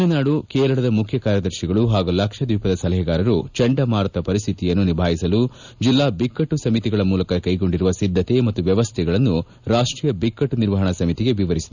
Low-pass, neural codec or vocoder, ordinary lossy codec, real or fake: 7.2 kHz; none; none; real